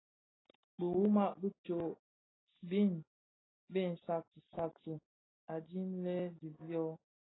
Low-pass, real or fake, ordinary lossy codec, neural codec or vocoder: 7.2 kHz; real; AAC, 16 kbps; none